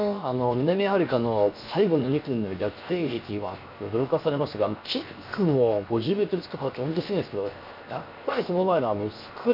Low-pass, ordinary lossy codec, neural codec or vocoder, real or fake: 5.4 kHz; none; codec, 16 kHz, 0.7 kbps, FocalCodec; fake